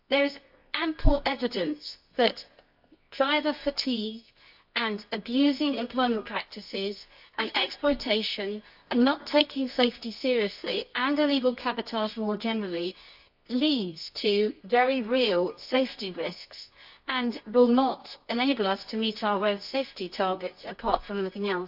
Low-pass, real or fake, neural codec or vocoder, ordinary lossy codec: 5.4 kHz; fake; codec, 24 kHz, 0.9 kbps, WavTokenizer, medium music audio release; AAC, 48 kbps